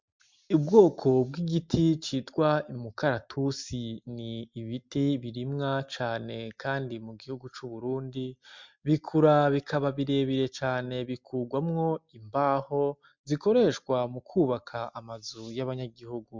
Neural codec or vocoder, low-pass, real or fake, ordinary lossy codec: none; 7.2 kHz; real; MP3, 64 kbps